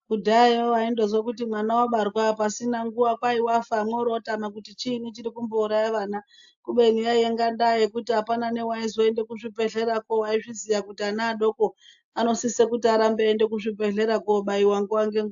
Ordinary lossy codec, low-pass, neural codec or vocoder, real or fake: AAC, 64 kbps; 7.2 kHz; none; real